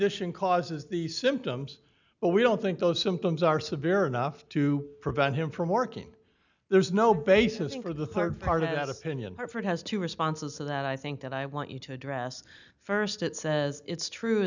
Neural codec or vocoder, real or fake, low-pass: none; real; 7.2 kHz